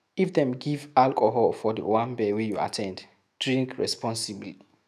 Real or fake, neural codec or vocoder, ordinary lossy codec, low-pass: fake; autoencoder, 48 kHz, 128 numbers a frame, DAC-VAE, trained on Japanese speech; none; 14.4 kHz